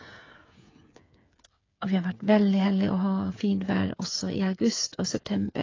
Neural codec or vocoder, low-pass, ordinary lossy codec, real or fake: codec, 16 kHz, 8 kbps, FreqCodec, smaller model; 7.2 kHz; AAC, 32 kbps; fake